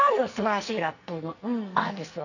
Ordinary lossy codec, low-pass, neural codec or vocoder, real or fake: none; 7.2 kHz; codec, 44.1 kHz, 2.6 kbps, SNAC; fake